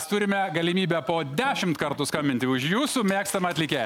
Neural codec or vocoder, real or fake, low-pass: none; real; 19.8 kHz